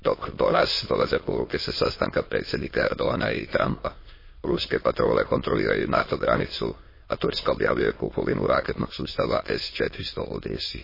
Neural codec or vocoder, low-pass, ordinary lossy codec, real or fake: autoencoder, 22.05 kHz, a latent of 192 numbers a frame, VITS, trained on many speakers; 5.4 kHz; MP3, 24 kbps; fake